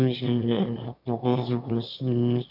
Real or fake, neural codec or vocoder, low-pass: fake; autoencoder, 22.05 kHz, a latent of 192 numbers a frame, VITS, trained on one speaker; 5.4 kHz